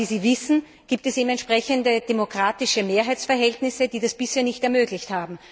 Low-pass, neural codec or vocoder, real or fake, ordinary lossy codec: none; none; real; none